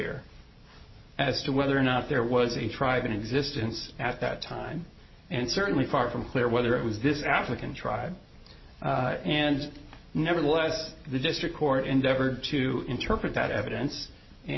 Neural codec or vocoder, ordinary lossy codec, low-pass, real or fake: none; MP3, 24 kbps; 7.2 kHz; real